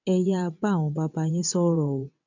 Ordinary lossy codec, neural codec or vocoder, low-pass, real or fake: none; vocoder, 22.05 kHz, 80 mel bands, WaveNeXt; 7.2 kHz; fake